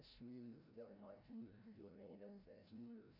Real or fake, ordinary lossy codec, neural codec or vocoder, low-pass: fake; none; codec, 16 kHz, 0.5 kbps, FreqCodec, larger model; 5.4 kHz